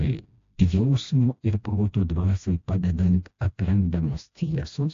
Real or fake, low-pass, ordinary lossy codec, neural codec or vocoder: fake; 7.2 kHz; AAC, 48 kbps; codec, 16 kHz, 1 kbps, FreqCodec, smaller model